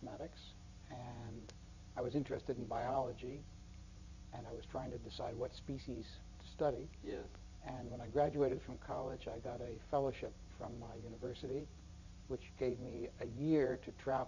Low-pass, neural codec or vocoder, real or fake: 7.2 kHz; vocoder, 44.1 kHz, 80 mel bands, Vocos; fake